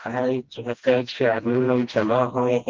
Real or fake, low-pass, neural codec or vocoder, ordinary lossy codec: fake; 7.2 kHz; codec, 16 kHz, 1 kbps, FreqCodec, smaller model; Opus, 32 kbps